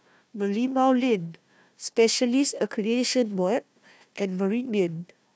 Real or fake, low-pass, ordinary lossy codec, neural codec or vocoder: fake; none; none; codec, 16 kHz, 1 kbps, FunCodec, trained on Chinese and English, 50 frames a second